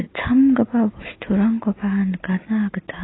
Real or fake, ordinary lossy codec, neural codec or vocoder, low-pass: real; AAC, 16 kbps; none; 7.2 kHz